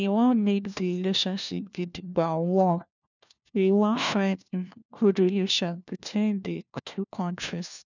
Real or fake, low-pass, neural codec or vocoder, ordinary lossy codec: fake; 7.2 kHz; codec, 16 kHz, 1 kbps, FunCodec, trained on LibriTTS, 50 frames a second; none